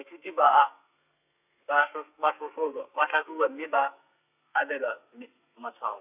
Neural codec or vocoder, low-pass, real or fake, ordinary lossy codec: codec, 32 kHz, 1.9 kbps, SNAC; 3.6 kHz; fake; none